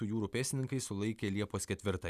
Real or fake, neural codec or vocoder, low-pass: real; none; 14.4 kHz